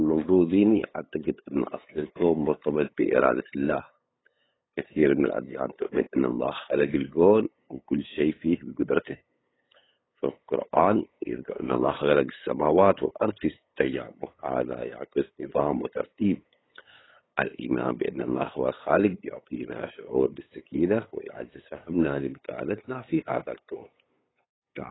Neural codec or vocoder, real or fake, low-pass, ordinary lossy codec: codec, 16 kHz, 8 kbps, FunCodec, trained on LibriTTS, 25 frames a second; fake; 7.2 kHz; AAC, 16 kbps